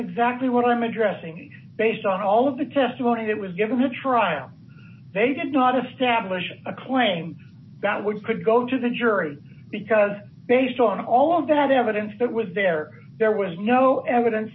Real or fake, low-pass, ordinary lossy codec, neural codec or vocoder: real; 7.2 kHz; MP3, 24 kbps; none